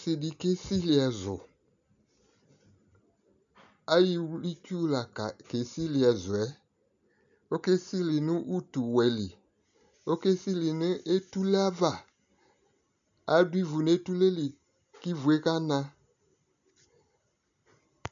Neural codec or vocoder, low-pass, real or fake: none; 7.2 kHz; real